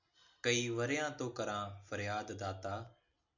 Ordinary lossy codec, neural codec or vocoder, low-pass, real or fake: MP3, 64 kbps; none; 7.2 kHz; real